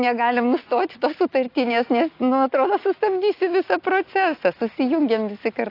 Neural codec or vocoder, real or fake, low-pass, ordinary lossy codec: none; real; 5.4 kHz; AAC, 32 kbps